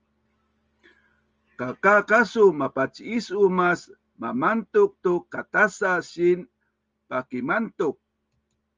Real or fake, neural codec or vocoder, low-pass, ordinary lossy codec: real; none; 7.2 kHz; Opus, 24 kbps